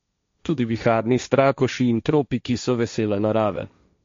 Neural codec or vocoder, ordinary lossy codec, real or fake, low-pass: codec, 16 kHz, 1.1 kbps, Voila-Tokenizer; MP3, 48 kbps; fake; 7.2 kHz